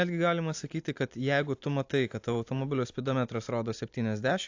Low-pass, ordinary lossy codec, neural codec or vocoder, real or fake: 7.2 kHz; AAC, 48 kbps; none; real